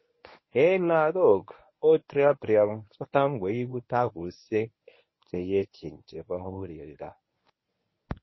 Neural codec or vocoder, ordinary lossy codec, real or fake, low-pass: codec, 24 kHz, 0.9 kbps, WavTokenizer, medium speech release version 1; MP3, 24 kbps; fake; 7.2 kHz